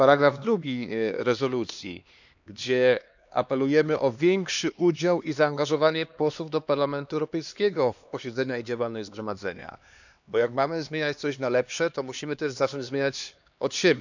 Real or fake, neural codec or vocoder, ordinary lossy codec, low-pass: fake; codec, 16 kHz, 2 kbps, X-Codec, HuBERT features, trained on LibriSpeech; none; 7.2 kHz